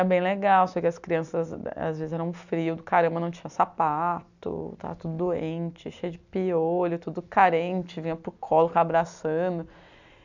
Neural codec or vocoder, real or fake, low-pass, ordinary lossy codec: none; real; 7.2 kHz; none